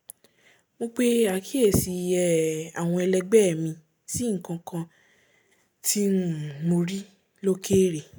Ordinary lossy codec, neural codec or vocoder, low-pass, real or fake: none; none; none; real